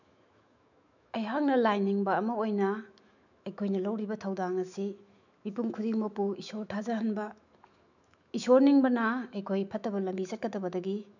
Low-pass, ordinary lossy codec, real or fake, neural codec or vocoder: 7.2 kHz; none; fake; autoencoder, 48 kHz, 128 numbers a frame, DAC-VAE, trained on Japanese speech